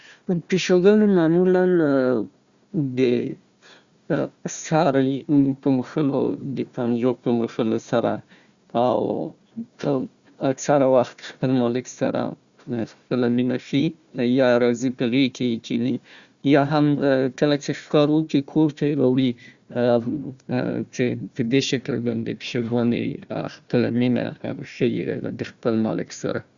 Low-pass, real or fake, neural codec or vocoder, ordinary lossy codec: 7.2 kHz; fake; codec, 16 kHz, 1 kbps, FunCodec, trained on Chinese and English, 50 frames a second; Opus, 64 kbps